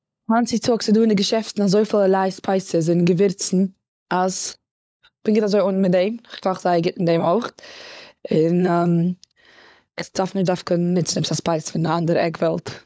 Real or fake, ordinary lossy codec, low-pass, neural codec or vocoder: fake; none; none; codec, 16 kHz, 16 kbps, FunCodec, trained on LibriTTS, 50 frames a second